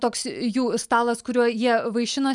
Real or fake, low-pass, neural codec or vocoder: real; 10.8 kHz; none